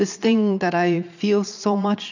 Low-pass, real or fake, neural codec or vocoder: 7.2 kHz; fake; vocoder, 22.05 kHz, 80 mel bands, Vocos